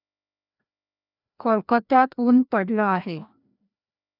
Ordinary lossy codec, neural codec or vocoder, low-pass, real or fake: none; codec, 16 kHz, 1 kbps, FreqCodec, larger model; 5.4 kHz; fake